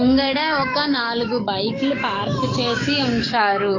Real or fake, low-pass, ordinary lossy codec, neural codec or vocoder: fake; 7.2 kHz; AAC, 32 kbps; codec, 44.1 kHz, 7.8 kbps, DAC